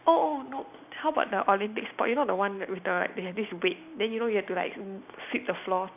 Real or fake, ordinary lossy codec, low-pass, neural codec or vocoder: real; none; 3.6 kHz; none